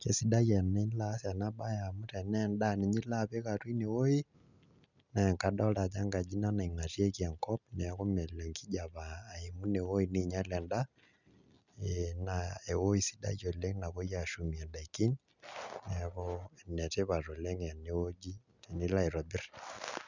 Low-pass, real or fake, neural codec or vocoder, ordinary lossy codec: 7.2 kHz; real; none; none